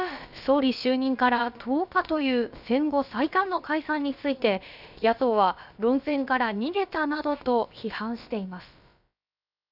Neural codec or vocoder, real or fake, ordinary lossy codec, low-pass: codec, 16 kHz, about 1 kbps, DyCAST, with the encoder's durations; fake; none; 5.4 kHz